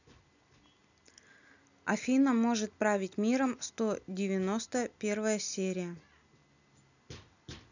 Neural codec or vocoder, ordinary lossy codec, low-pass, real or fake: none; none; 7.2 kHz; real